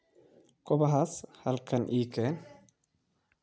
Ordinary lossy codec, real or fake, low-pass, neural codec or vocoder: none; real; none; none